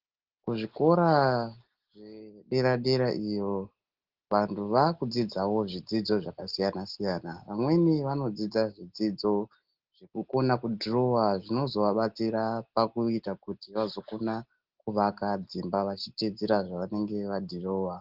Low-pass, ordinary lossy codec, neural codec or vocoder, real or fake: 5.4 kHz; Opus, 16 kbps; none; real